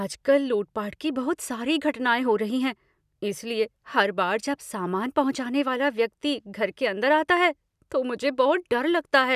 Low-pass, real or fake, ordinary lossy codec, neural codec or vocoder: 14.4 kHz; real; none; none